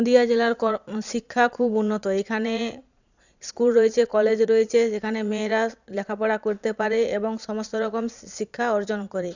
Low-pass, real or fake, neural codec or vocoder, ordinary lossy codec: 7.2 kHz; fake; vocoder, 22.05 kHz, 80 mel bands, Vocos; none